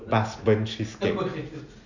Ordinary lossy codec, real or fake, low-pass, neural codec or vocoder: none; real; 7.2 kHz; none